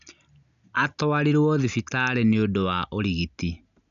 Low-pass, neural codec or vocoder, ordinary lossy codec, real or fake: 7.2 kHz; none; none; real